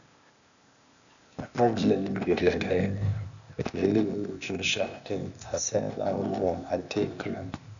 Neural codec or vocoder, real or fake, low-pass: codec, 16 kHz, 0.8 kbps, ZipCodec; fake; 7.2 kHz